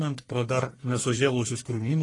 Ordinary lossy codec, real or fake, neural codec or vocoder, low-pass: AAC, 32 kbps; fake; codec, 44.1 kHz, 1.7 kbps, Pupu-Codec; 10.8 kHz